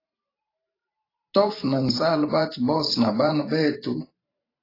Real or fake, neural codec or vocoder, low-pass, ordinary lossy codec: fake; vocoder, 44.1 kHz, 128 mel bands every 512 samples, BigVGAN v2; 5.4 kHz; AAC, 24 kbps